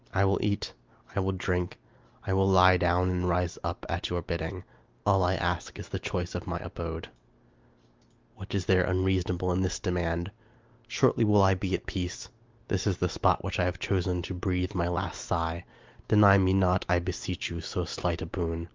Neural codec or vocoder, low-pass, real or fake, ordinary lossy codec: none; 7.2 kHz; real; Opus, 32 kbps